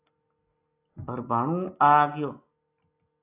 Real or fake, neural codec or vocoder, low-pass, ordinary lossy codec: real; none; 3.6 kHz; AAC, 24 kbps